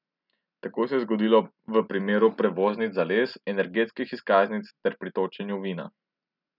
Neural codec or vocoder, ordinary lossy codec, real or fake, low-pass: none; none; real; 5.4 kHz